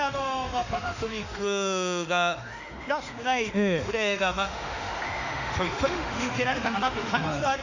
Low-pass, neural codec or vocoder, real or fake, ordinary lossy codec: 7.2 kHz; autoencoder, 48 kHz, 32 numbers a frame, DAC-VAE, trained on Japanese speech; fake; none